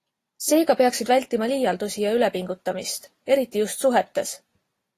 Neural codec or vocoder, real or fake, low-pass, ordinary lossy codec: none; real; 14.4 kHz; AAC, 48 kbps